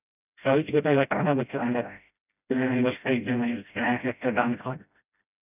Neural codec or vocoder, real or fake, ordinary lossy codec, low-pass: codec, 16 kHz, 0.5 kbps, FreqCodec, smaller model; fake; none; 3.6 kHz